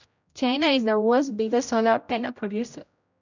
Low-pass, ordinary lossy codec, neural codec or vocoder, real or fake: 7.2 kHz; none; codec, 16 kHz, 0.5 kbps, X-Codec, HuBERT features, trained on general audio; fake